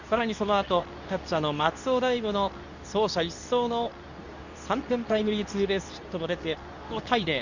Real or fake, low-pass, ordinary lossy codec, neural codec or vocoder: fake; 7.2 kHz; none; codec, 24 kHz, 0.9 kbps, WavTokenizer, medium speech release version 2